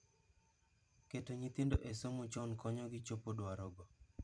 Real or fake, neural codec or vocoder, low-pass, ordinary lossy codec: fake; vocoder, 44.1 kHz, 128 mel bands every 256 samples, BigVGAN v2; 9.9 kHz; none